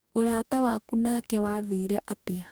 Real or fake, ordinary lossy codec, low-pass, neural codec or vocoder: fake; none; none; codec, 44.1 kHz, 2.6 kbps, DAC